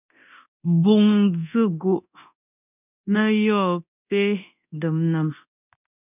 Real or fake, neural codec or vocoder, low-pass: fake; codec, 24 kHz, 0.9 kbps, DualCodec; 3.6 kHz